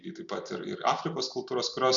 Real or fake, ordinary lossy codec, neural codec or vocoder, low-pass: real; MP3, 96 kbps; none; 7.2 kHz